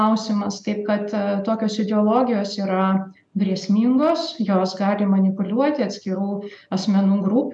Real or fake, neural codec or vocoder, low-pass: real; none; 10.8 kHz